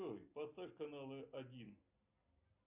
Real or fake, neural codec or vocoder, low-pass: real; none; 3.6 kHz